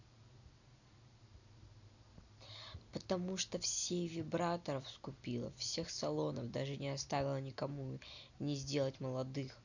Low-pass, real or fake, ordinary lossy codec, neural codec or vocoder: 7.2 kHz; real; none; none